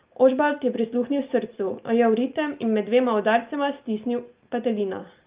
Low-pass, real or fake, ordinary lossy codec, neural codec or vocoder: 3.6 kHz; real; Opus, 32 kbps; none